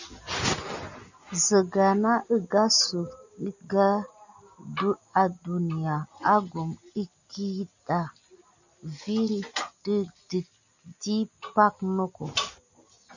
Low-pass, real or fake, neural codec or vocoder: 7.2 kHz; real; none